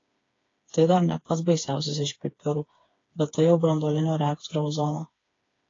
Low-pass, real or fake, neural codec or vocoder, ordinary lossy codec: 7.2 kHz; fake; codec, 16 kHz, 4 kbps, FreqCodec, smaller model; AAC, 32 kbps